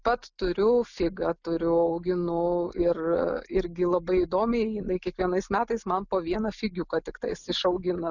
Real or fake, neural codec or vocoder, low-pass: real; none; 7.2 kHz